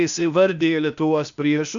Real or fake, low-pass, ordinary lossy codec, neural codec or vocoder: fake; 7.2 kHz; MP3, 96 kbps; codec, 16 kHz, 0.8 kbps, ZipCodec